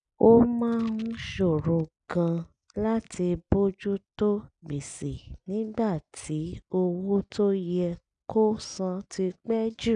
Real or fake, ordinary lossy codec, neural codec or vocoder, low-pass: real; none; none; 9.9 kHz